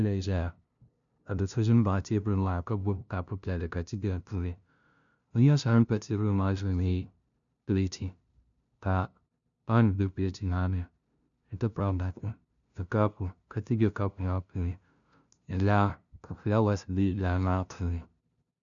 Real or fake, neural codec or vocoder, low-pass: fake; codec, 16 kHz, 0.5 kbps, FunCodec, trained on LibriTTS, 25 frames a second; 7.2 kHz